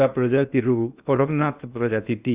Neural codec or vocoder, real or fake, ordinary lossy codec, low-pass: codec, 16 kHz in and 24 kHz out, 0.8 kbps, FocalCodec, streaming, 65536 codes; fake; Opus, 64 kbps; 3.6 kHz